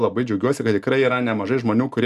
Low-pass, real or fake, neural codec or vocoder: 14.4 kHz; real; none